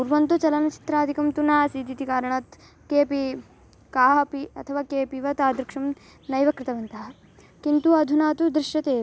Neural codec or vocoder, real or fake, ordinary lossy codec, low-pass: none; real; none; none